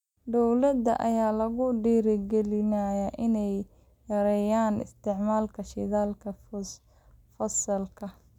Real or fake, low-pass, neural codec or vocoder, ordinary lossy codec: real; 19.8 kHz; none; none